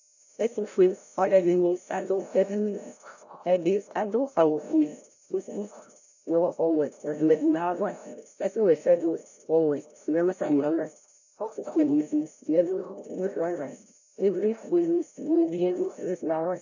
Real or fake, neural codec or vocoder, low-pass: fake; codec, 16 kHz, 0.5 kbps, FreqCodec, larger model; 7.2 kHz